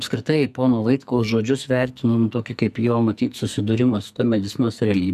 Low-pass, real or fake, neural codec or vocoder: 14.4 kHz; fake; codec, 44.1 kHz, 2.6 kbps, SNAC